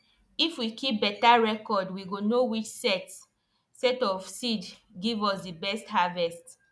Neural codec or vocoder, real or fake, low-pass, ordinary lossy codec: none; real; none; none